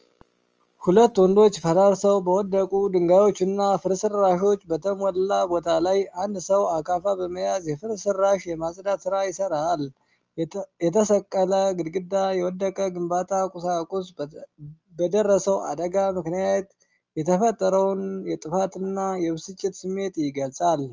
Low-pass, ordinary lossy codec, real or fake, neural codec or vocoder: 7.2 kHz; Opus, 24 kbps; real; none